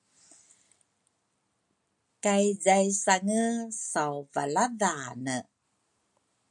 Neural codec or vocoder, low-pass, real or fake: none; 10.8 kHz; real